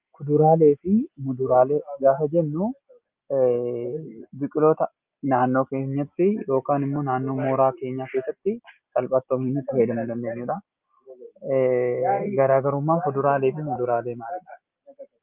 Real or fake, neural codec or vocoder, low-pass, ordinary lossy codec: real; none; 3.6 kHz; Opus, 24 kbps